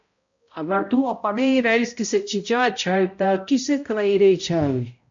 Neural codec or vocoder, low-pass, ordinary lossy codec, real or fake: codec, 16 kHz, 0.5 kbps, X-Codec, HuBERT features, trained on balanced general audio; 7.2 kHz; MP3, 48 kbps; fake